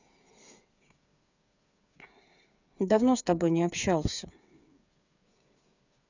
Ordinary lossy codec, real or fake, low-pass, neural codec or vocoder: none; fake; 7.2 kHz; codec, 16 kHz, 8 kbps, FreqCodec, smaller model